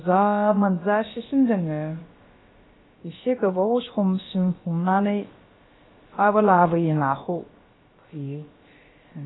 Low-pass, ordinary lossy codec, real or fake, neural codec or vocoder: 7.2 kHz; AAC, 16 kbps; fake; codec, 16 kHz, about 1 kbps, DyCAST, with the encoder's durations